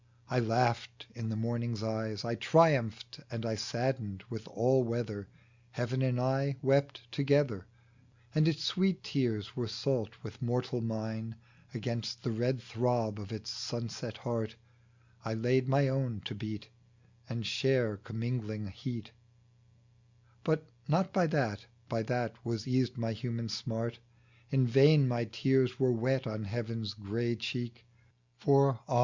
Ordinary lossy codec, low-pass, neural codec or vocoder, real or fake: Opus, 64 kbps; 7.2 kHz; none; real